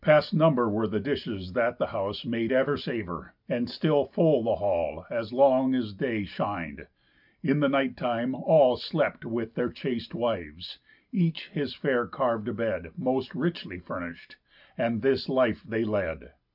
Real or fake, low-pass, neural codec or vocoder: real; 5.4 kHz; none